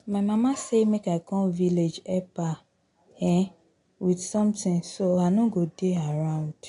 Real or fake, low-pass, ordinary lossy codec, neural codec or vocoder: real; 10.8 kHz; AAC, 48 kbps; none